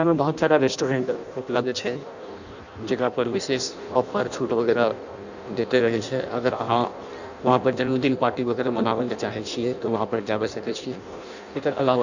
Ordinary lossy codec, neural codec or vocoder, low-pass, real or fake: none; codec, 16 kHz in and 24 kHz out, 0.6 kbps, FireRedTTS-2 codec; 7.2 kHz; fake